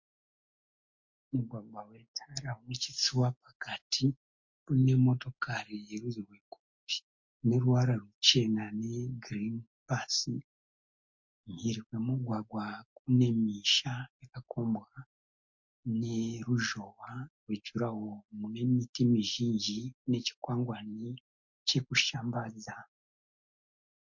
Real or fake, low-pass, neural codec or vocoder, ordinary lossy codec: real; 7.2 kHz; none; MP3, 48 kbps